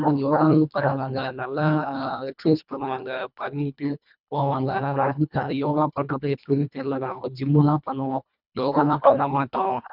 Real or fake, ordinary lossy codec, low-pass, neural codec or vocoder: fake; none; 5.4 kHz; codec, 24 kHz, 1.5 kbps, HILCodec